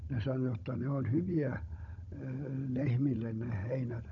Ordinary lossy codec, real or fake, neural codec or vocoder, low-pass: none; fake; codec, 16 kHz, 16 kbps, FunCodec, trained on Chinese and English, 50 frames a second; 7.2 kHz